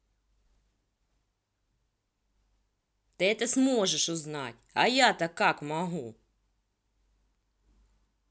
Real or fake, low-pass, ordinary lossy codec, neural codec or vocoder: real; none; none; none